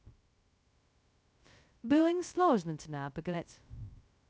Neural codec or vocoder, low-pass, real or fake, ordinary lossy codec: codec, 16 kHz, 0.2 kbps, FocalCodec; none; fake; none